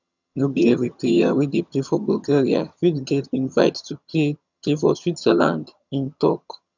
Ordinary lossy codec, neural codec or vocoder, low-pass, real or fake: none; vocoder, 22.05 kHz, 80 mel bands, HiFi-GAN; 7.2 kHz; fake